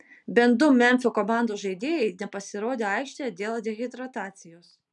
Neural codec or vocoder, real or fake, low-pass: none; real; 10.8 kHz